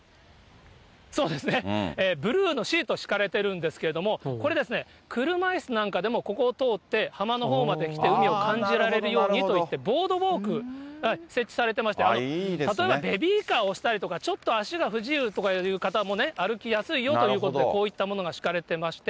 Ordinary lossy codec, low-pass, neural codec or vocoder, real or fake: none; none; none; real